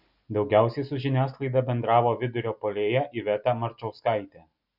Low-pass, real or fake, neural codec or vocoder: 5.4 kHz; real; none